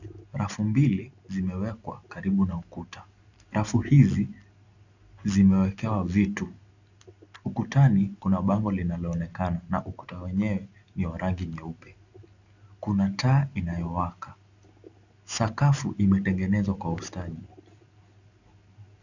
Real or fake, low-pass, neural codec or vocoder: real; 7.2 kHz; none